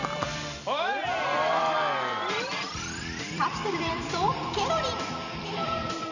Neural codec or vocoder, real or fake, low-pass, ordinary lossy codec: vocoder, 44.1 kHz, 128 mel bands every 256 samples, BigVGAN v2; fake; 7.2 kHz; none